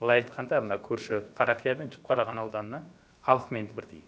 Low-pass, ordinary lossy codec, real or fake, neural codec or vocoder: none; none; fake; codec, 16 kHz, about 1 kbps, DyCAST, with the encoder's durations